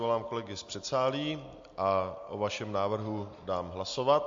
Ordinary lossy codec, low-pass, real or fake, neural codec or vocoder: MP3, 48 kbps; 7.2 kHz; real; none